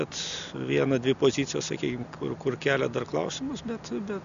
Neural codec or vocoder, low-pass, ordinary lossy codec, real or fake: none; 7.2 kHz; MP3, 96 kbps; real